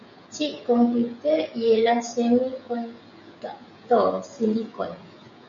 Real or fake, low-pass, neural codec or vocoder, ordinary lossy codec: fake; 7.2 kHz; codec, 16 kHz, 16 kbps, FreqCodec, smaller model; MP3, 48 kbps